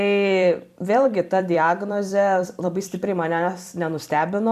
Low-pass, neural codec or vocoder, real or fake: 14.4 kHz; vocoder, 44.1 kHz, 128 mel bands every 256 samples, BigVGAN v2; fake